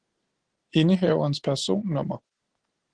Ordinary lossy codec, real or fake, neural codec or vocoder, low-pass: Opus, 16 kbps; real; none; 9.9 kHz